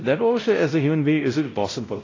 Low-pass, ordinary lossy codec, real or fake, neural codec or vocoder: 7.2 kHz; AAC, 32 kbps; fake; codec, 16 kHz, 0.5 kbps, X-Codec, WavLM features, trained on Multilingual LibriSpeech